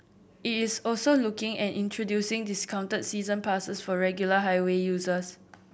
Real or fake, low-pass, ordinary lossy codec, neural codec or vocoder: real; none; none; none